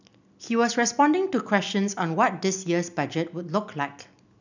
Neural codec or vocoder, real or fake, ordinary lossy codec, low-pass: none; real; none; 7.2 kHz